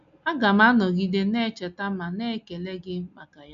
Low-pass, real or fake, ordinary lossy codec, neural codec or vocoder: 7.2 kHz; real; none; none